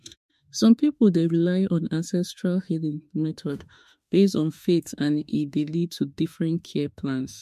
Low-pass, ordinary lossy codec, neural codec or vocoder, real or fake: 14.4 kHz; MP3, 64 kbps; autoencoder, 48 kHz, 32 numbers a frame, DAC-VAE, trained on Japanese speech; fake